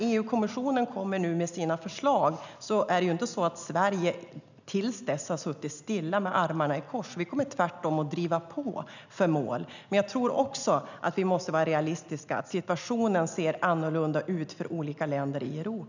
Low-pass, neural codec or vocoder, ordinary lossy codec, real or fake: 7.2 kHz; none; none; real